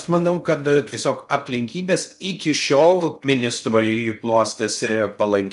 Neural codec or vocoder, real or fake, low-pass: codec, 16 kHz in and 24 kHz out, 0.6 kbps, FocalCodec, streaming, 2048 codes; fake; 10.8 kHz